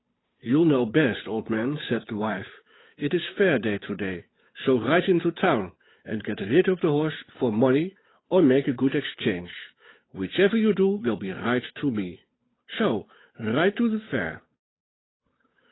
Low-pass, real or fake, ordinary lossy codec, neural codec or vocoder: 7.2 kHz; fake; AAC, 16 kbps; codec, 16 kHz, 8 kbps, FunCodec, trained on Chinese and English, 25 frames a second